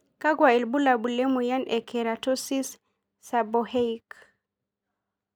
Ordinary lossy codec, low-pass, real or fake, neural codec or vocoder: none; none; real; none